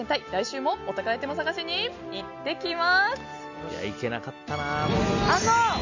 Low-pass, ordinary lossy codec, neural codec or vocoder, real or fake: 7.2 kHz; none; none; real